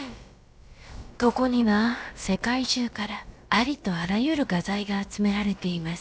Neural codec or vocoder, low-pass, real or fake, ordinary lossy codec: codec, 16 kHz, about 1 kbps, DyCAST, with the encoder's durations; none; fake; none